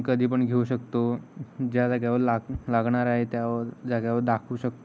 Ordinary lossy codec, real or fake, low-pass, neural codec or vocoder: none; real; none; none